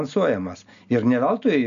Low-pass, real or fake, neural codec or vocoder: 7.2 kHz; real; none